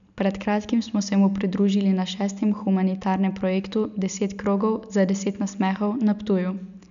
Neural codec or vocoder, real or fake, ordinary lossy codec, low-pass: none; real; none; 7.2 kHz